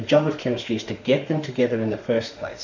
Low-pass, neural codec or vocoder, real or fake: 7.2 kHz; autoencoder, 48 kHz, 32 numbers a frame, DAC-VAE, trained on Japanese speech; fake